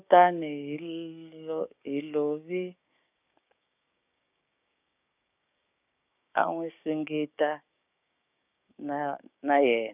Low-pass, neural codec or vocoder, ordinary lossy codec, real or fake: 3.6 kHz; codec, 16 kHz, 6 kbps, DAC; none; fake